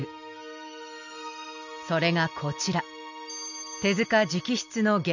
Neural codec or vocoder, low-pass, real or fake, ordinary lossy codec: none; 7.2 kHz; real; none